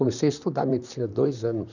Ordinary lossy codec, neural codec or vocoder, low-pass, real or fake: none; vocoder, 44.1 kHz, 128 mel bands, Pupu-Vocoder; 7.2 kHz; fake